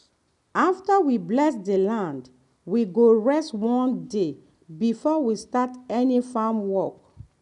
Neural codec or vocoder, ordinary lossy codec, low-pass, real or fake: none; none; 10.8 kHz; real